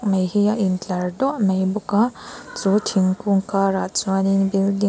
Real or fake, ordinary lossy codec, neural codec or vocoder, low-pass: real; none; none; none